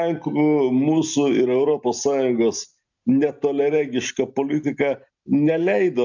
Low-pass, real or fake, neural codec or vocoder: 7.2 kHz; real; none